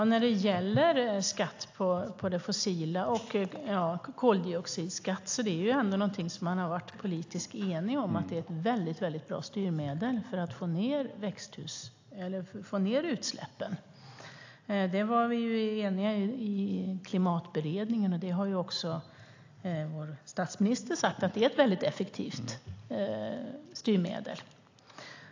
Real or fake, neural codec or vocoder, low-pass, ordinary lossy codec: real; none; 7.2 kHz; AAC, 48 kbps